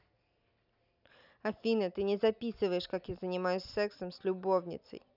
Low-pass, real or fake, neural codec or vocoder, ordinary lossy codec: 5.4 kHz; real; none; none